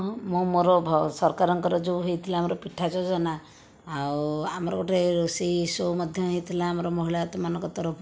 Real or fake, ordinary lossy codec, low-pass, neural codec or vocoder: real; none; none; none